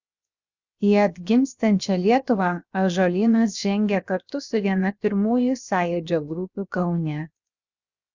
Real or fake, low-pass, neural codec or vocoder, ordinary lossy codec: fake; 7.2 kHz; codec, 16 kHz, 0.7 kbps, FocalCodec; Opus, 64 kbps